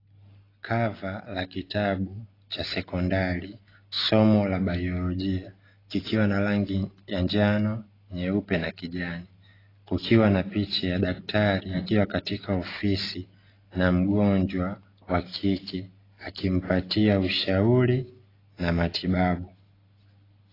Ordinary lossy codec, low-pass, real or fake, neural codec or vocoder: AAC, 24 kbps; 5.4 kHz; real; none